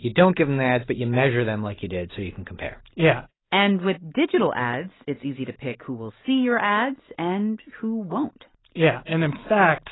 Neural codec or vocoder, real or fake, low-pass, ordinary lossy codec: none; real; 7.2 kHz; AAC, 16 kbps